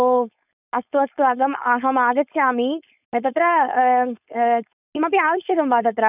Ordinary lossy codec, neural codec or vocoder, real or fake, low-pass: none; codec, 16 kHz, 4.8 kbps, FACodec; fake; 3.6 kHz